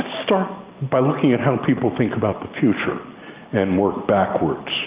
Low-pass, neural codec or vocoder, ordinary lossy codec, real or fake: 3.6 kHz; none; Opus, 32 kbps; real